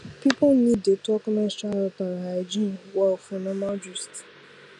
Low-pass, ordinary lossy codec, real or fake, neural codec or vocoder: 10.8 kHz; none; real; none